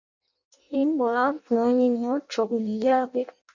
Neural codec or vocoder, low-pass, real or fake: codec, 16 kHz in and 24 kHz out, 0.6 kbps, FireRedTTS-2 codec; 7.2 kHz; fake